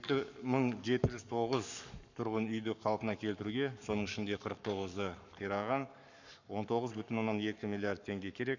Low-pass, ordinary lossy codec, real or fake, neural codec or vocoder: 7.2 kHz; none; fake; codec, 44.1 kHz, 7.8 kbps, Pupu-Codec